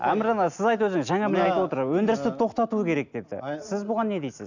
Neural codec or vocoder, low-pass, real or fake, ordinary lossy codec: none; 7.2 kHz; real; none